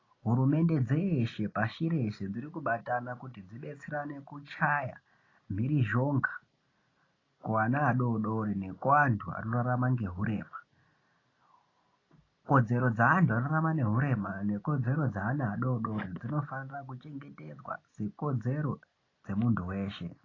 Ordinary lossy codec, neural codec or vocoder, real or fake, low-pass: AAC, 32 kbps; none; real; 7.2 kHz